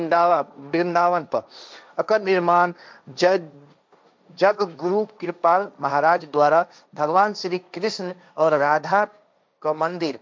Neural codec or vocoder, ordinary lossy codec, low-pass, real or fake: codec, 16 kHz, 1.1 kbps, Voila-Tokenizer; none; none; fake